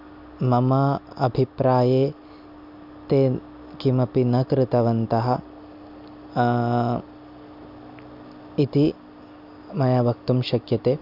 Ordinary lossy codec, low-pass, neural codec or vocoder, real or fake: MP3, 48 kbps; 5.4 kHz; none; real